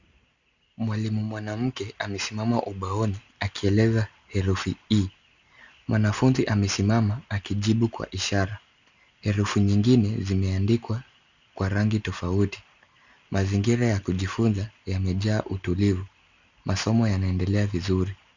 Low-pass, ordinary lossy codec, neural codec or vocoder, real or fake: 7.2 kHz; Opus, 64 kbps; none; real